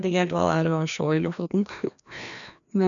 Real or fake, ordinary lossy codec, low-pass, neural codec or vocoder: fake; none; 7.2 kHz; codec, 16 kHz, 1 kbps, FreqCodec, larger model